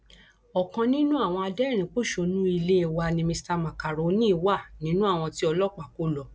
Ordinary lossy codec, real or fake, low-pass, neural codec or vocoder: none; real; none; none